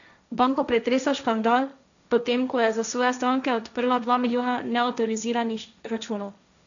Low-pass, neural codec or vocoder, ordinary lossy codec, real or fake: 7.2 kHz; codec, 16 kHz, 1.1 kbps, Voila-Tokenizer; none; fake